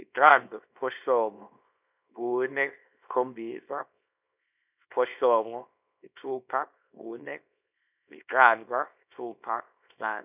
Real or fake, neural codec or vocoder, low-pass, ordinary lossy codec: fake; codec, 24 kHz, 0.9 kbps, WavTokenizer, small release; 3.6 kHz; none